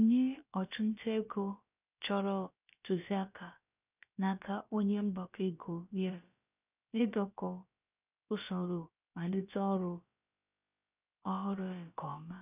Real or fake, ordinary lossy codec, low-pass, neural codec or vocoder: fake; none; 3.6 kHz; codec, 16 kHz, about 1 kbps, DyCAST, with the encoder's durations